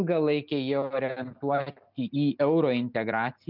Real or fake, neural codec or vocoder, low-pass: real; none; 5.4 kHz